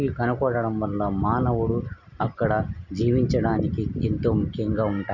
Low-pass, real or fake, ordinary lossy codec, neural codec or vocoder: 7.2 kHz; real; none; none